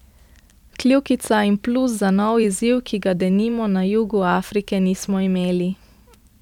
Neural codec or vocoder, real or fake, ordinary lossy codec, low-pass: vocoder, 44.1 kHz, 128 mel bands every 256 samples, BigVGAN v2; fake; none; 19.8 kHz